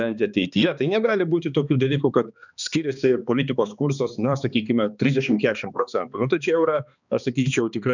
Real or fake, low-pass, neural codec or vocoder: fake; 7.2 kHz; codec, 16 kHz, 2 kbps, X-Codec, HuBERT features, trained on balanced general audio